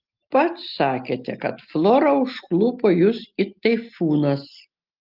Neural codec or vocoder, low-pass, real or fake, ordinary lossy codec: none; 5.4 kHz; real; Opus, 32 kbps